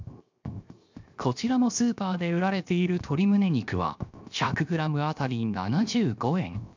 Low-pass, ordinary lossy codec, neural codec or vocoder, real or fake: 7.2 kHz; MP3, 64 kbps; codec, 16 kHz, 0.7 kbps, FocalCodec; fake